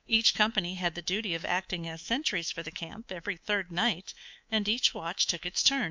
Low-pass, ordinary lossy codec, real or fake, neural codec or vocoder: 7.2 kHz; MP3, 64 kbps; fake; codec, 24 kHz, 3.1 kbps, DualCodec